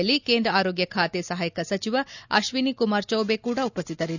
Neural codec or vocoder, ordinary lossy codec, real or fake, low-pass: none; none; real; 7.2 kHz